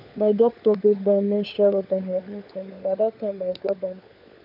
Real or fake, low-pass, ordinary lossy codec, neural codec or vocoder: fake; 5.4 kHz; none; codec, 16 kHz, 4 kbps, FunCodec, trained on LibriTTS, 50 frames a second